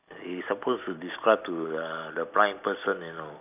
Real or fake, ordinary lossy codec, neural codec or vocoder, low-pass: real; none; none; 3.6 kHz